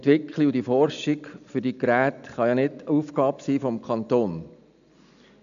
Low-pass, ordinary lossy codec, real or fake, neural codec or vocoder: 7.2 kHz; none; real; none